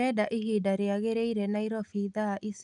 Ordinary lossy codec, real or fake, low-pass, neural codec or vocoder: none; real; 10.8 kHz; none